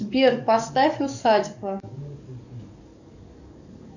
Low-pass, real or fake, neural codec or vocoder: 7.2 kHz; fake; codec, 44.1 kHz, 7.8 kbps, DAC